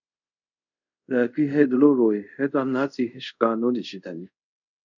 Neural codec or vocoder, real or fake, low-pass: codec, 24 kHz, 0.5 kbps, DualCodec; fake; 7.2 kHz